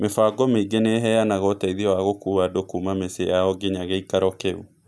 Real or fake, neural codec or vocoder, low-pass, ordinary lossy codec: real; none; none; none